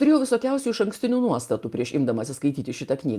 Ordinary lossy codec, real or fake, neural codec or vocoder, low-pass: Opus, 32 kbps; real; none; 14.4 kHz